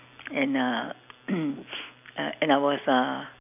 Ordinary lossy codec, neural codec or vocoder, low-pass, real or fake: none; none; 3.6 kHz; real